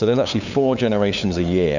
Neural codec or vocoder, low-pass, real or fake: codec, 16 kHz, 8 kbps, FunCodec, trained on LibriTTS, 25 frames a second; 7.2 kHz; fake